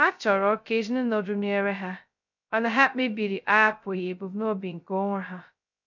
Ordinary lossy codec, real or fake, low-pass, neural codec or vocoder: none; fake; 7.2 kHz; codec, 16 kHz, 0.2 kbps, FocalCodec